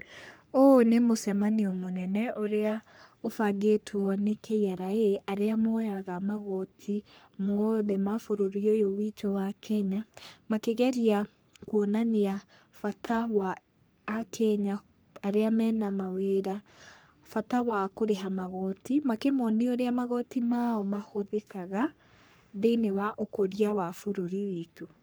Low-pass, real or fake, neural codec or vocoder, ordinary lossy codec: none; fake; codec, 44.1 kHz, 3.4 kbps, Pupu-Codec; none